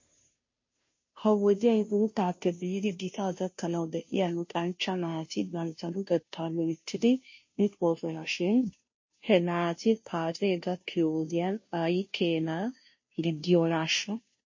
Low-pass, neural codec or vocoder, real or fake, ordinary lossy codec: 7.2 kHz; codec, 16 kHz, 0.5 kbps, FunCodec, trained on Chinese and English, 25 frames a second; fake; MP3, 32 kbps